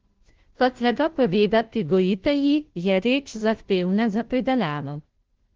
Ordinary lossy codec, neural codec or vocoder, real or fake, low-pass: Opus, 16 kbps; codec, 16 kHz, 0.5 kbps, FunCodec, trained on Chinese and English, 25 frames a second; fake; 7.2 kHz